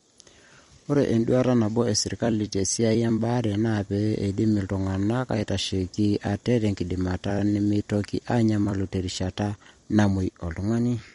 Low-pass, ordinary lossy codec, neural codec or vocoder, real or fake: 19.8 kHz; MP3, 48 kbps; vocoder, 44.1 kHz, 128 mel bands every 256 samples, BigVGAN v2; fake